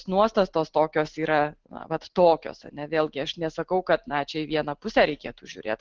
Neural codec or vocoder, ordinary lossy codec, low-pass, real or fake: none; Opus, 16 kbps; 7.2 kHz; real